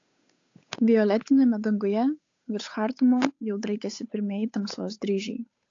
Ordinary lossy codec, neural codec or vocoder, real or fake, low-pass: AAC, 48 kbps; codec, 16 kHz, 8 kbps, FunCodec, trained on Chinese and English, 25 frames a second; fake; 7.2 kHz